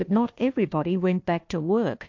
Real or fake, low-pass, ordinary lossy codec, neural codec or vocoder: fake; 7.2 kHz; MP3, 48 kbps; codec, 16 kHz, 1 kbps, FunCodec, trained on Chinese and English, 50 frames a second